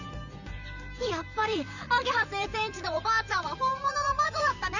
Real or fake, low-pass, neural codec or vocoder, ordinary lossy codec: fake; 7.2 kHz; vocoder, 44.1 kHz, 128 mel bands, Pupu-Vocoder; none